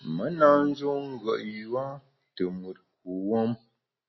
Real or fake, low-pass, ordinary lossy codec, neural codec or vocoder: real; 7.2 kHz; MP3, 24 kbps; none